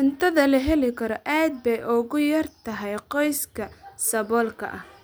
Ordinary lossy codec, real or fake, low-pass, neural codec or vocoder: none; real; none; none